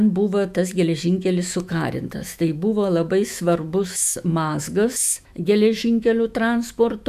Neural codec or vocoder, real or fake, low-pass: autoencoder, 48 kHz, 128 numbers a frame, DAC-VAE, trained on Japanese speech; fake; 14.4 kHz